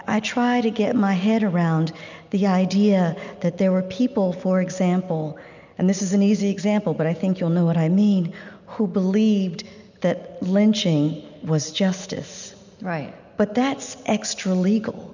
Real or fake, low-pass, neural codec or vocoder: real; 7.2 kHz; none